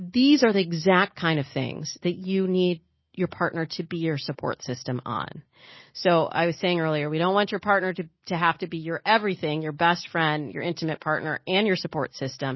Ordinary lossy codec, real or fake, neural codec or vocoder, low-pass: MP3, 24 kbps; real; none; 7.2 kHz